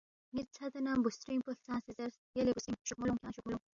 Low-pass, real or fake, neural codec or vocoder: 7.2 kHz; real; none